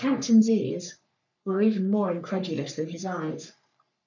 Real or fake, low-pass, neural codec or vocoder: fake; 7.2 kHz; codec, 44.1 kHz, 3.4 kbps, Pupu-Codec